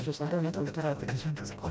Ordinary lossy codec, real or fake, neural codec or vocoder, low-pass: none; fake; codec, 16 kHz, 0.5 kbps, FreqCodec, smaller model; none